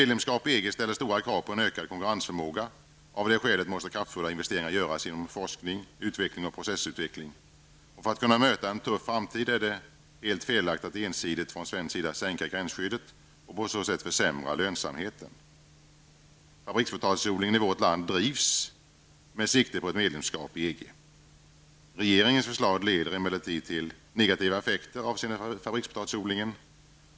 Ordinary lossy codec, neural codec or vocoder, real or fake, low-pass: none; none; real; none